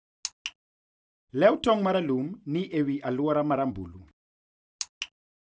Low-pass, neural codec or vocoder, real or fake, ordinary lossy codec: none; none; real; none